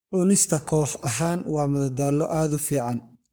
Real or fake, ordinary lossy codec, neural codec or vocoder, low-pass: fake; none; codec, 44.1 kHz, 3.4 kbps, Pupu-Codec; none